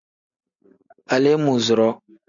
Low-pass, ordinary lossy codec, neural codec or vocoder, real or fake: 7.2 kHz; MP3, 96 kbps; none; real